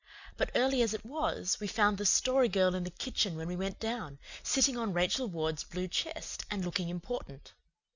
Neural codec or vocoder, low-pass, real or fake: none; 7.2 kHz; real